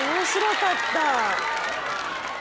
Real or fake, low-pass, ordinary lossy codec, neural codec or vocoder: real; none; none; none